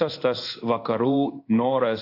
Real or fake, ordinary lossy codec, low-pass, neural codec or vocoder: fake; AAC, 32 kbps; 5.4 kHz; codec, 16 kHz in and 24 kHz out, 1 kbps, XY-Tokenizer